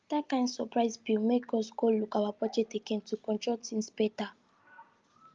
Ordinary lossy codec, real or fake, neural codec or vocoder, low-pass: Opus, 24 kbps; real; none; 7.2 kHz